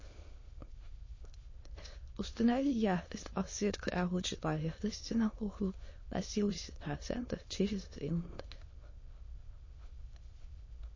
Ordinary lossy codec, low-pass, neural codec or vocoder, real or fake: MP3, 32 kbps; 7.2 kHz; autoencoder, 22.05 kHz, a latent of 192 numbers a frame, VITS, trained on many speakers; fake